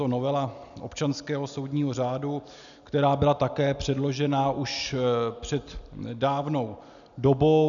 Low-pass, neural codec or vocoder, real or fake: 7.2 kHz; none; real